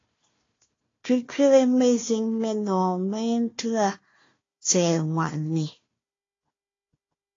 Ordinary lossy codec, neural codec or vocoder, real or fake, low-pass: AAC, 32 kbps; codec, 16 kHz, 1 kbps, FunCodec, trained on Chinese and English, 50 frames a second; fake; 7.2 kHz